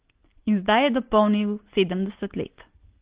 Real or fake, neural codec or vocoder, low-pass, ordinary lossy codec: real; none; 3.6 kHz; Opus, 16 kbps